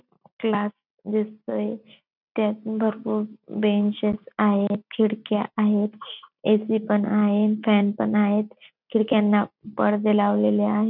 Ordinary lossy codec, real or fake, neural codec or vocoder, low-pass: none; real; none; 5.4 kHz